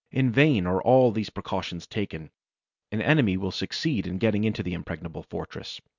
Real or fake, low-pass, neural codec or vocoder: real; 7.2 kHz; none